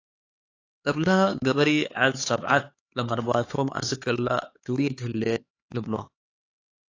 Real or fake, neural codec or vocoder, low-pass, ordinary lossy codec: fake; codec, 16 kHz, 4 kbps, X-Codec, HuBERT features, trained on LibriSpeech; 7.2 kHz; AAC, 32 kbps